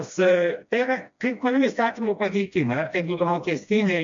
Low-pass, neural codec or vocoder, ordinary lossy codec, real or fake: 7.2 kHz; codec, 16 kHz, 1 kbps, FreqCodec, smaller model; MP3, 64 kbps; fake